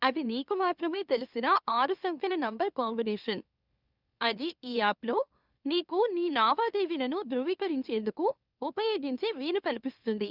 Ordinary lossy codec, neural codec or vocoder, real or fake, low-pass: Opus, 64 kbps; autoencoder, 44.1 kHz, a latent of 192 numbers a frame, MeloTTS; fake; 5.4 kHz